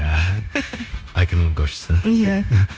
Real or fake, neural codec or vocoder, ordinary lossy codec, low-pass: fake; codec, 16 kHz, 0.9 kbps, LongCat-Audio-Codec; none; none